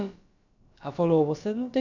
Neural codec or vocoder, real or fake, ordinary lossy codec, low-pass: codec, 16 kHz, about 1 kbps, DyCAST, with the encoder's durations; fake; none; 7.2 kHz